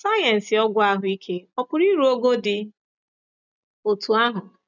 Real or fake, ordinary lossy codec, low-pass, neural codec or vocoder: real; none; 7.2 kHz; none